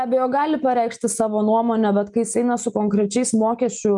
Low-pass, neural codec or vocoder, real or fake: 10.8 kHz; none; real